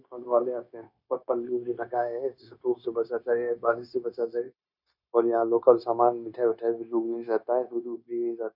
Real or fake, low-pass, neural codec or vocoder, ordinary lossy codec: fake; 5.4 kHz; codec, 16 kHz, 0.9 kbps, LongCat-Audio-Codec; none